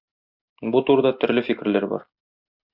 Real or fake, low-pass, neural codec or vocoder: real; 5.4 kHz; none